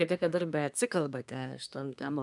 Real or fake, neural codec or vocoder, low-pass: fake; codec, 24 kHz, 1 kbps, SNAC; 10.8 kHz